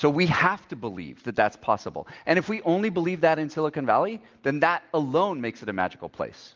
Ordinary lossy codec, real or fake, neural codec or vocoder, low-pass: Opus, 24 kbps; real; none; 7.2 kHz